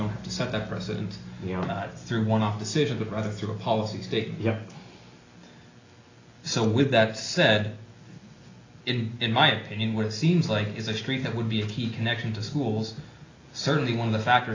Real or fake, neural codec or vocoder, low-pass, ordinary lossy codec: real; none; 7.2 kHz; AAC, 32 kbps